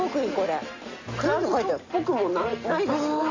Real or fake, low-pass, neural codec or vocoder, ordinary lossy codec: real; 7.2 kHz; none; AAC, 32 kbps